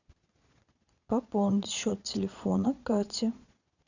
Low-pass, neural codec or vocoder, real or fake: 7.2 kHz; vocoder, 22.05 kHz, 80 mel bands, Vocos; fake